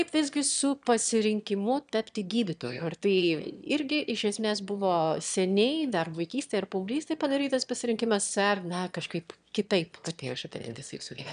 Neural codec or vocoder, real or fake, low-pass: autoencoder, 22.05 kHz, a latent of 192 numbers a frame, VITS, trained on one speaker; fake; 9.9 kHz